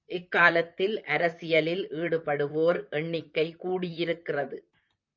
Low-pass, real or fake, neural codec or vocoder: 7.2 kHz; fake; vocoder, 44.1 kHz, 128 mel bands, Pupu-Vocoder